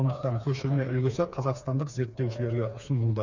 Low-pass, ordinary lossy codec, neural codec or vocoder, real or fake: 7.2 kHz; none; codec, 16 kHz, 4 kbps, FreqCodec, smaller model; fake